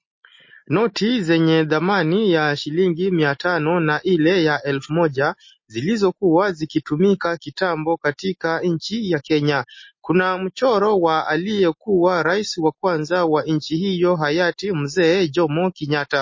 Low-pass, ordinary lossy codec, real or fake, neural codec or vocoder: 7.2 kHz; MP3, 32 kbps; real; none